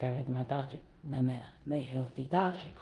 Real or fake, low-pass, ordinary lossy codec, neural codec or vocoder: fake; 10.8 kHz; Opus, 32 kbps; codec, 16 kHz in and 24 kHz out, 0.9 kbps, LongCat-Audio-Codec, four codebook decoder